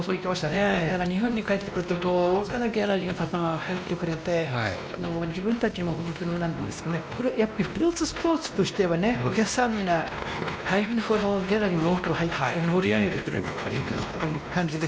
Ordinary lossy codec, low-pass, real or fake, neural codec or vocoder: none; none; fake; codec, 16 kHz, 1 kbps, X-Codec, WavLM features, trained on Multilingual LibriSpeech